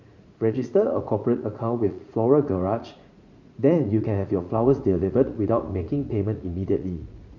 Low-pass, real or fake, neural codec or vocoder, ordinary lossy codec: 7.2 kHz; fake; vocoder, 44.1 kHz, 80 mel bands, Vocos; none